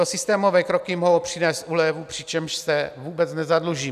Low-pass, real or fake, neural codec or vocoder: 14.4 kHz; real; none